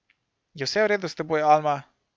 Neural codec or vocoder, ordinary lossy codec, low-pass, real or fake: none; none; none; real